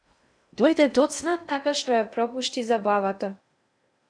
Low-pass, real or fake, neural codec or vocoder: 9.9 kHz; fake; codec, 16 kHz in and 24 kHz out, 0.6 kbps, FocalCodec, streaming, 2048 codes